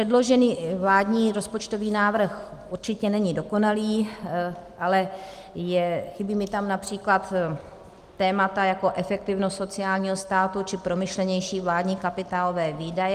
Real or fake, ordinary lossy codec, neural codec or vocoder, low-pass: real; Opus, 24 kbps; none; 14.4 kHz